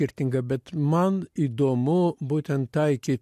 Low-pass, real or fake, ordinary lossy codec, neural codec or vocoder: 14.4 kHz; real; MP3, 64 kbps; none